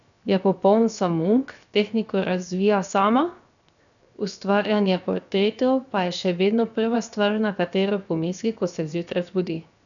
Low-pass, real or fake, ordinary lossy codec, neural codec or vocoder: 7.2 kHz; fake; none; codec, 16 kHz, 0.7 kbps, FocalCodec